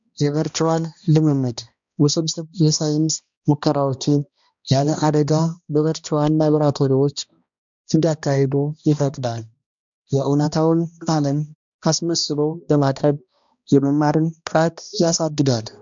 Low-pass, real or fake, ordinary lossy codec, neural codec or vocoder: 7.2 kHz; fake; MP3, 64 kbps; codec, 16 kHz, 1 kbps, X-Codec, HuBERT features, trained on balanced general audio